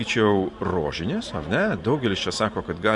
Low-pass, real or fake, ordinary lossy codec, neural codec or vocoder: 10.8 kHz; fake; MP3, 64 kbps; vocoder, 44.1 kHz, 128 mel bands every 512 samples, BigVGAN v2